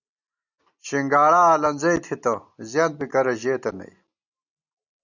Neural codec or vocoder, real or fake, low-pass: none; real; 7.2 kHz